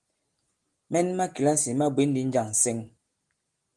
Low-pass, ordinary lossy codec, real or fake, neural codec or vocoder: 10.8 kHz; Opus, 24 kbps; real; none